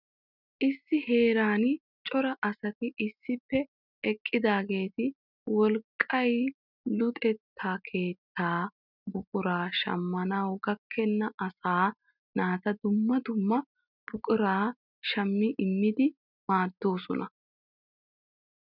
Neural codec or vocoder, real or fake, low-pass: none; real; 5.4 kHz